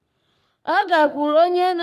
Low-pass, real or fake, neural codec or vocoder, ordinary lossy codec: 14.4 kHz; fake; codec, 44.1 kHz, 3.4 kbps, Pupu-Codec; none